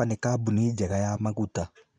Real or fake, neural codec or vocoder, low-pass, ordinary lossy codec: real; none; 9.9 kHz; none